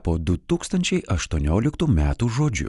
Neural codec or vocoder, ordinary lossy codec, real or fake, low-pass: none; Opus, 64 kbps; real; 10.8 kHz